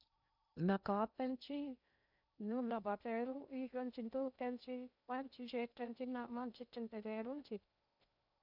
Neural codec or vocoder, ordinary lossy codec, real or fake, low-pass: codec, 16 kHz in and 24 kHz out, 0.6 kbps, FocalCodec, streaming, 2048 codes; none; fake; 5.4 kHz